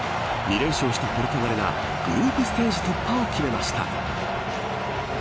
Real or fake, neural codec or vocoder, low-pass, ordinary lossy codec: real; none; none; none